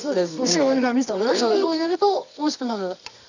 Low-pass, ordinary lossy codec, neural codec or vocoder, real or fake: 7.2 kHz; none; codec, 24 kHz, 0.9 kbps, WavTokenizer, medium music audio release; fake